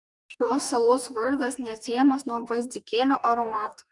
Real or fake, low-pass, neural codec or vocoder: fake; 10.8 kHz; codec, 44.1 kHz, 2.6 kbps, DAC